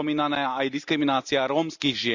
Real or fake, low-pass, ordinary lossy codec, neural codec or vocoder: real; 7.2 kHz; none; none